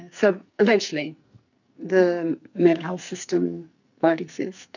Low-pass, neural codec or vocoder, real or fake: 7.2 kHz; codec, 44.1 kHz, 2.6 kbps, SNAC; fake